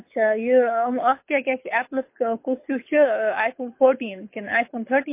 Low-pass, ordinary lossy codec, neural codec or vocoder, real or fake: 3.6 kHz; MP3, 24 kbps; codec, 24 kHz, 6 kbps, HILCodec; fake